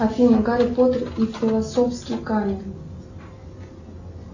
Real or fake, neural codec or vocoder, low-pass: real; none; 7.2 kHz